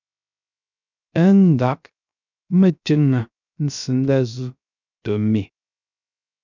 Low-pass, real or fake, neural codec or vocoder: 7.2 kHz; fake; codec, 16 kHz, 0.3 kbps, FocalCodec